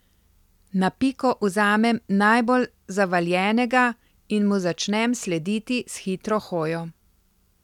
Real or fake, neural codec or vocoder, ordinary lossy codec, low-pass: real; none; none; 19.8 kHz